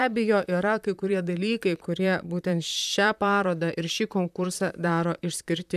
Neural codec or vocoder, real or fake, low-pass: vocoder, 44.1 kHz, 128 mel bands, Pupu-Vocoder; fake; 14.4 kHz